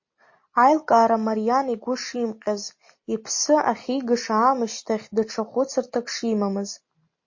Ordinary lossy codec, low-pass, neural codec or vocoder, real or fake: MP3, 32 kbps; 7.2 kHz; none; real